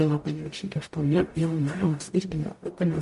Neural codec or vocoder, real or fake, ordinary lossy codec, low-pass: codec, 44.1 kHz, 0.9 kbps, DAC; fake; MP3, 48 kbps; 14.4 kHz